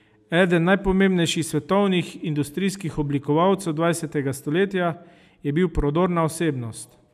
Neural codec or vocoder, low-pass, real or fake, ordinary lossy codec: none; 14.4 kHz; real; none